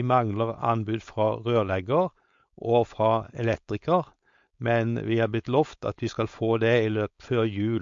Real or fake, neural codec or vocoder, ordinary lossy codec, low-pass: fake; codec, 16 kHz, 4.8 kbps, FACodec; MP3, 48 kbps; 7.2 kHz